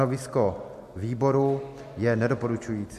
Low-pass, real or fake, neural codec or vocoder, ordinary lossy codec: 14.4 kHz; real; none; MP3, 64 kbps